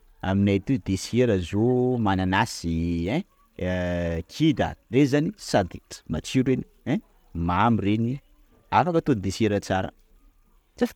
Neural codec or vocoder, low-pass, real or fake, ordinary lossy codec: none; 19.8 kHz; real; MP3, 96 kbps